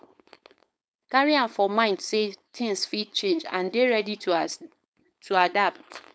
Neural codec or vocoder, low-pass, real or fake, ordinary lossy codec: codec, 16 kHz, 4.8 kbps, FACodec; none; fake; none